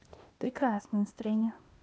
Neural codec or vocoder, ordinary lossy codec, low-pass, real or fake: codec, 16 kHz, 0.8 kbps, ZipCodec; none; none; fake